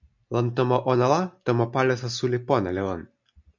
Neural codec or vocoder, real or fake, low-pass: none; real; 7.2 kHz